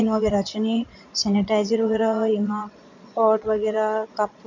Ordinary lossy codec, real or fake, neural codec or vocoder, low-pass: MP3, 64 kbps; fake; vocoder, 22.05 kHz, 80 mel bands, Vocos; 7.2 kHz